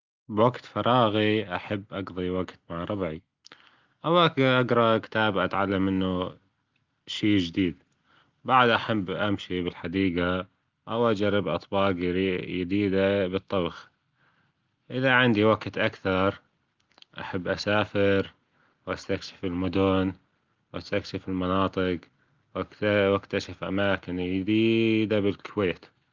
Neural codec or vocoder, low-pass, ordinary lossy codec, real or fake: none; 7.2 kHz; Opus, 24 kbps; real